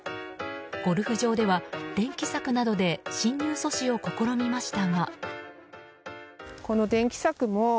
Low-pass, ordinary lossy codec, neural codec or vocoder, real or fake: none; none; none; real